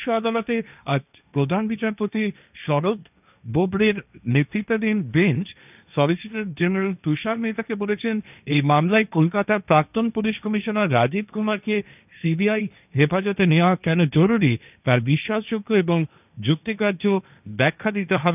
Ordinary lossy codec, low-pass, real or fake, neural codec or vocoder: none; 3.6 kHz; fake; codec, 16 kHz, 1.1 kbps, Voila-Tokenizer